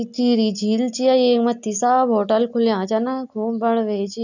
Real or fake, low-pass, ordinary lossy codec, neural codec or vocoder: real; 7.2 kHz; none; none